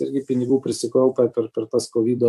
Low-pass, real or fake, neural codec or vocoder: 14.4 kHz; fake; vocoder, 44.1 kHz, 128 mel bands every 256 samples, BigVGAN v2